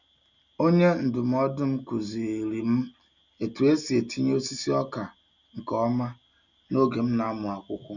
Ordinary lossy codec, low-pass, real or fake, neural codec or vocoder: none; 7.2 kHz; real; none